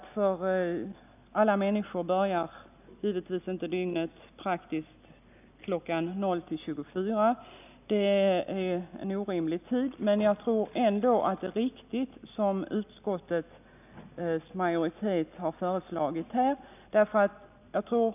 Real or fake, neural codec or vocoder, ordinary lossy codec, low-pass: real; none; none; 3.6 kHz